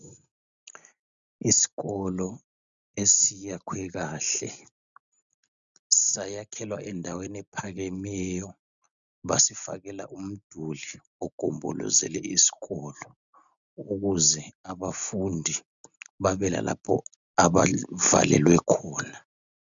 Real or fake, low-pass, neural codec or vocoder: real; 7.2 kHz; none